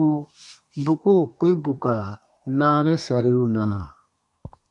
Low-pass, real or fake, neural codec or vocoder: 10.8 kHz; fake; codec, 24 kHz, 1 kbps, SNAC